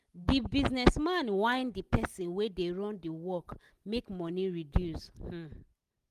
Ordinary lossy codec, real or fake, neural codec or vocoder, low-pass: Opus, 24 kbps; real; none; 14.4 kHz